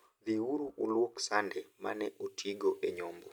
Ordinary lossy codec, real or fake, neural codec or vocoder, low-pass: none; real; none; none